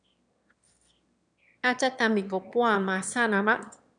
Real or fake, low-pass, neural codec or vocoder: fake; 9.9 kHz; autoencoder, 22.05 kHz, a latent of 192 numbers a frame, VITS, trained on one speaker